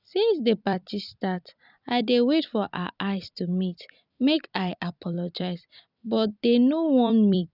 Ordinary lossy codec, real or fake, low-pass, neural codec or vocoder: none; fake; 5.4 kHz; vocoder, 44.1 kHz, 128 mel bands every 256 samples, BigVGAN v2